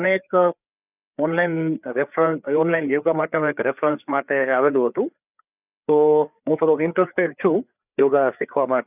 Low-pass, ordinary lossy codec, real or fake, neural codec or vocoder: 3.6 kHz; none; fake; codec, 16 kHz, 4 kbps, FreqCodec, larger model